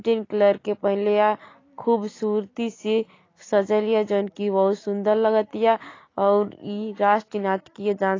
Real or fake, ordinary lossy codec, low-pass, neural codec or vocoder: real; AAC, 32 kbps; 7.2 kHz; none